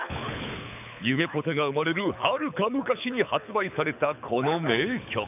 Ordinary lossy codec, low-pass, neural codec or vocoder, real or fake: none; 3.6 kHz; codec, 24 kHz, 6 kbps, HILCodec; fake